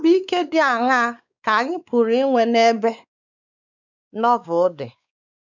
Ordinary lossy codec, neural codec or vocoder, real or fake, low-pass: none; codec, 16 kHz, 4 kbps, X-Codec, WavLM features, trained on Multilingual LibriSpeech; fake; 7.2 kHz